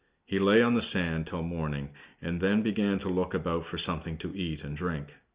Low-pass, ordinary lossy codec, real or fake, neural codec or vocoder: 3.6 kHz; Opus, 64 kbps; real; none